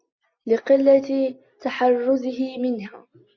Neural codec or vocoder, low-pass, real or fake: none; 7.2 kHz; real